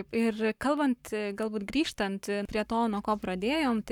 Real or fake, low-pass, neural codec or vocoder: fake; 19.8 kHz; codec, 44.1 kHz, 7.8 kbps, Pupu-Codec